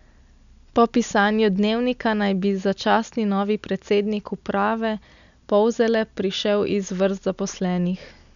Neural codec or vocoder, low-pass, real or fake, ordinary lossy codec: none; 7.2 kHz; real; none